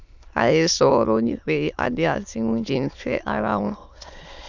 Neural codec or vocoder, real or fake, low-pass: autoencoder, 22.05 kHz, a latent of 192 numbers a frame, VITS, trained on many speakers; fake; 7.2 kHz